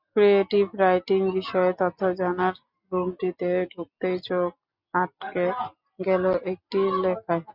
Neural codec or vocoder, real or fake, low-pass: none; real; 5.4 kHz